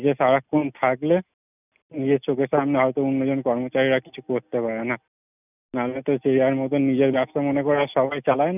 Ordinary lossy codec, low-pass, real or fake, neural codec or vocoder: none; 3.6 kHz; real; none